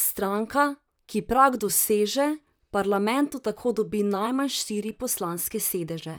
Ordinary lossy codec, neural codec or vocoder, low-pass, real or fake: none; vocoder, 44.1 kHz, 128 mel bands, Pupu-Vocoder; none; fake